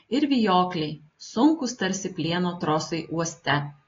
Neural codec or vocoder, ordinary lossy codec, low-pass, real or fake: none; AAC, 32 kbps; 7.2 kHz; real